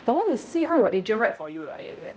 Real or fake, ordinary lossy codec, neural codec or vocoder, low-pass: fake; none; codec, 16 kHz, 0.5 kbps, X-Codec, HuBERT features, trained on balanced general audio; none